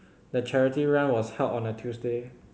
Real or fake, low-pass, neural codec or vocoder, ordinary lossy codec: real; none; none; none